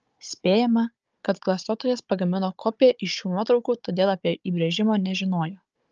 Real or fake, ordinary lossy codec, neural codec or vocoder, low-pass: fake; Opus, 24 kbps; codec, 16 kHz, 16 kbps, FunCodec, trained on Chinese and English, 50 frames a second; 7.2 kHz